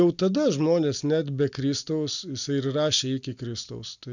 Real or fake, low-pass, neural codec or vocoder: real; 7.2 kHz; none